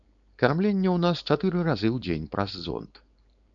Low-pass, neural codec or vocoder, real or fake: 7.2 kHz; codec, 16 kHz, 4.8 kbps, FACodec; fake